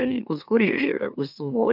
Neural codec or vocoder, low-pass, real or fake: autoencoder, 44.1 kHz, a latent of 192 numbers a frame, MeloTTS; 5.4 kHz; fake